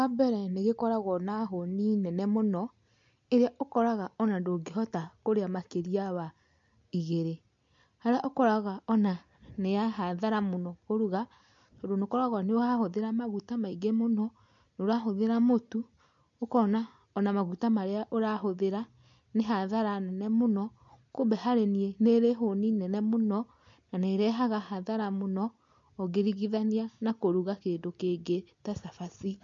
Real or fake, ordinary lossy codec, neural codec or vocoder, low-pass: real; MP3, 48 kbps; none; 7.2 kHz